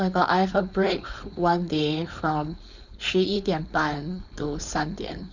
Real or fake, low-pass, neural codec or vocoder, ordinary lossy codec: fake; 7.2 kHz; codec, 16 kHz, 4.8 kbps, FACodec; none